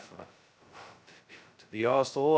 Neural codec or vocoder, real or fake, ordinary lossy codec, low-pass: codec, 16 kHz, 0.2 kbps, FocalCodec; fake; none; none